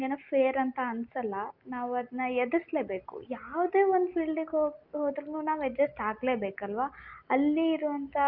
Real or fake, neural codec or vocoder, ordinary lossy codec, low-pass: real; none; Opus, 32 kbps; 5.4 kHz